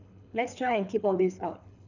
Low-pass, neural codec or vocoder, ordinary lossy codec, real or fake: 7.2 kHz; codec, 24 kHz, 6 kbps, HILCodec; none; fake